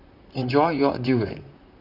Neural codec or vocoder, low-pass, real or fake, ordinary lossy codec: vocoder, 44.1 kHz, 128 mel bands, Pupu-Vocoder; 5.4 kHz; fake; none